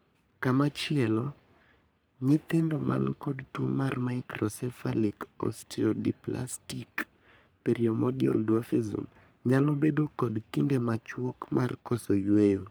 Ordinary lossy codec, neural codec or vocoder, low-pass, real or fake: none; codec, 44.1 kHz, 3.4 kbps, Pupu-Codec; none; fake